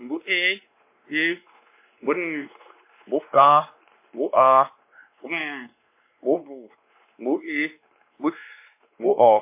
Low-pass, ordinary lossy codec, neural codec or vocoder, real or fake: 3.6 kHz; MP3, 24 kbps; codec, 16 kHz, 2 kbps, X-Codec, WavLM features, trained on Multilingual LibriSpeech; fake